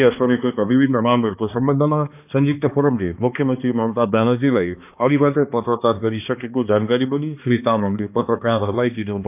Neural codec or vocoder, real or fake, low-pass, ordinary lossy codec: codec, 16 kHz, 2 kbps, X-Codec, HuBERT features, trained on balanced general audio; fake; 3.6 kHz; none